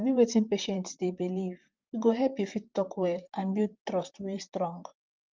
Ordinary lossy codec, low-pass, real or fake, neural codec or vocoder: Opus, 24 kbps; 7.2 kHz; fake; vocoder, 44.1 kHz, 128 mel bands, Pupu-Vocoder